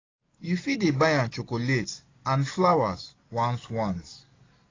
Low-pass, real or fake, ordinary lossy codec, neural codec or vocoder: 7.2 kHz; real; AAC, 32 kbps; none